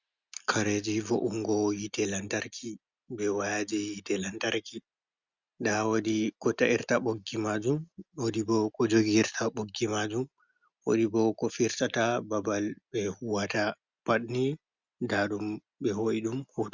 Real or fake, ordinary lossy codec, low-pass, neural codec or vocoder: real; Opus, 64 kbps; 7.2 kHz; none